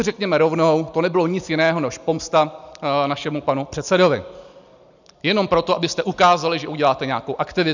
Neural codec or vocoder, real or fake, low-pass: none; real; 7.2 kHz